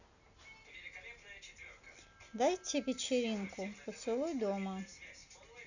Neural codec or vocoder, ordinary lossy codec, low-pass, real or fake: none; none; 7.2 kHz; real